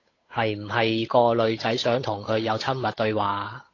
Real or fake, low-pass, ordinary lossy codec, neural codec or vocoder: fake; 7.2 kHz; AAC, 32 kbps; codec, 16 kHz, 8 kbps, FunCodec, trained on Chinese and English, 25 frames a second